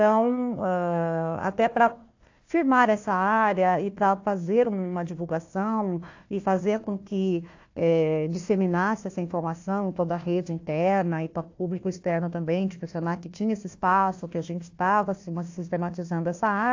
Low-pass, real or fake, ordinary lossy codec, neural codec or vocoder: 7.2 kHz; fake; AAC, 48 kbps; codec, 16 kHz, 1 kbps, FunCodec, trained on Chinese and English, 50 frames a second